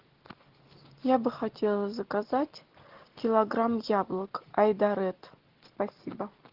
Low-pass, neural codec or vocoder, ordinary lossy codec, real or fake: 5.4 kHz; none; Opus, 16 kbps; real